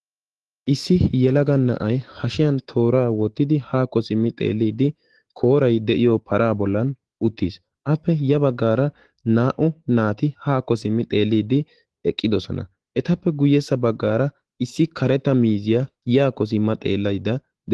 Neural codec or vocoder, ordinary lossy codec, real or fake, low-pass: autoencoder, 48 kHz, 128 numbers a frame, DAC-VAE, trained on Japanese speech; Opus, 16 kbps; fake; 10.8 kHz